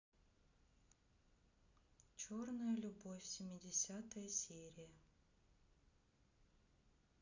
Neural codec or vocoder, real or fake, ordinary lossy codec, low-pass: none; real; none; 7.2 kHz